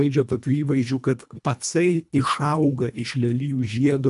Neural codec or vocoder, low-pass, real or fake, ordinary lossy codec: codec, 24 kHz, 1.5 kbps, HILCodec; 10.8 kHz; fake; MP3, 96 kbps